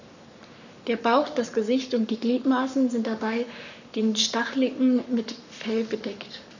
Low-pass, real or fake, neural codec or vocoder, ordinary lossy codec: 7.2 kHz; fake; codec, 44.1 kHz, 7.8 kbps, Pupu-Codec; none